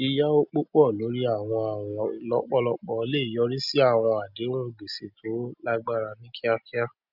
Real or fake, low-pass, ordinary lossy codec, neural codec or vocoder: real; 5.4 kHz; none; none